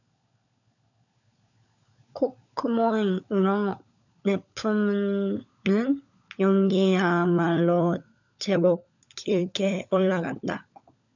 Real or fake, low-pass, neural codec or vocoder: fake; 7.2 kHz; codec, 16 kHz, 16 kbps, FunCodec, trained on LibriTTS, 50 frames a second